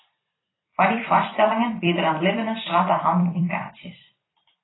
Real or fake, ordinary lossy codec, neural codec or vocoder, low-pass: fake; AAC, 16 kbps; vocoder, 44.1 kHz, 128 mel bands every 256 samples, BigVGAN v2; 7.2 kHz